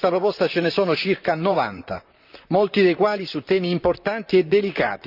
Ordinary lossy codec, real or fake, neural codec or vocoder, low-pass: none; fake; vocoder, 44.1 kHz, 128 mel bands, Pupu-Vocoder; 5.4 kHz